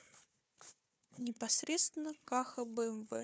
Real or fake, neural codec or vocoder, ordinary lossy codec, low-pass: fake; codec, 16 kHz, 4 kbps, FunCodec, trained on Chinese and English, 50 frames a second; none; none